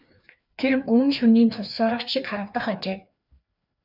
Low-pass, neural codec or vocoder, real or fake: 5.4 kHz; codec, 16 kHz, 2 kbps, FreqCodec, larger model; fake